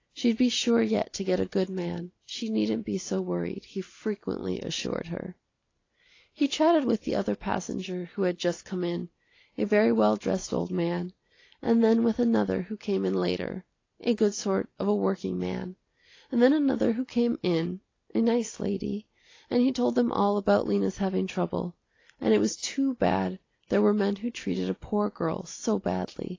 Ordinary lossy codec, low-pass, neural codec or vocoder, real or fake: AAC, 32 kbps; 7.2 kHz; none; real